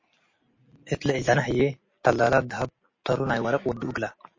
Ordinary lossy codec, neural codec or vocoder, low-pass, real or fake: MP3, 32 kbps; none; 7.2 kHz; real